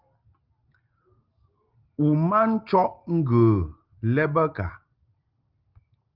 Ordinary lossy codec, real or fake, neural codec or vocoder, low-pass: Opus, 32 kbps; real; none; 5.4 kHz